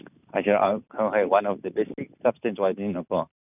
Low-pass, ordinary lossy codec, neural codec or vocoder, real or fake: 3.6 kHz; none; none; real